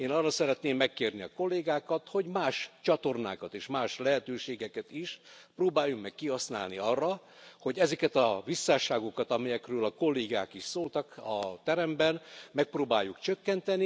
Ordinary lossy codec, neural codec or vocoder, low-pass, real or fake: none; none; none; real